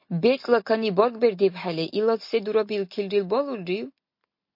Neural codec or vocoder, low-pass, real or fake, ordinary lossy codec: none; 5.4 kHz; real; MP3, 32 kbps